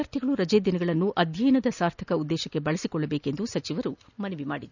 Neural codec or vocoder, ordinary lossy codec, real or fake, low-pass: none; none; real; 7.2 kHz